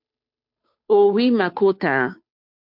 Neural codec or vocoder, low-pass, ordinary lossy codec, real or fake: codec, 16 kHz, 2 kbps, FunCodec, trained on Chinese and English, 25 frames a second; 5.4 kHz; MP3, 48 kbps; fake